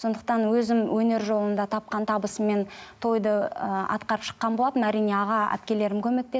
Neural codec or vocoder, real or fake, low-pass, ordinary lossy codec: none; real; none; none